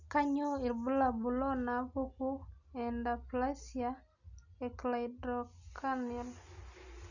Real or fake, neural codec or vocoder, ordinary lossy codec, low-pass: real; none; none; 7.2 kHz